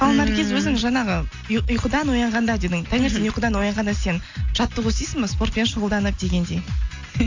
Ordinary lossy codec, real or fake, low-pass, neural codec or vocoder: AAC, 48 kbps; real; 7.2 kHz; none